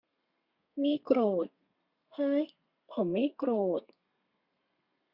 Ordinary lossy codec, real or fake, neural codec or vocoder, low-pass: Opus, 64 kbps; fake; codec, 32 kHz, 1.9 kbps, SNAC; 5.4 kHz